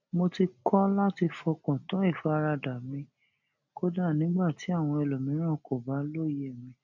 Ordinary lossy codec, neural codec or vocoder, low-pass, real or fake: none; none; 7.2 kHz; real